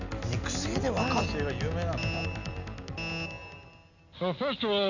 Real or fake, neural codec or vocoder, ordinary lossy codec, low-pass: real; none; none; 7.2 kHz